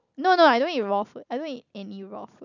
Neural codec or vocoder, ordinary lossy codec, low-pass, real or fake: none; none; 7.2 kHz; real